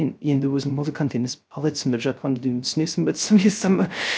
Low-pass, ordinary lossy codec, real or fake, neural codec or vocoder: none; none; fake; codec, 16 kHz, 0.3 kbps, FocalCodec